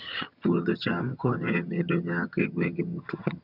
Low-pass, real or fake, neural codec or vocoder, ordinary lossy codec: 5.4 kHz; fake; vocoder, 22.05 kHz, 80 mel bands, HiFi-GAN; none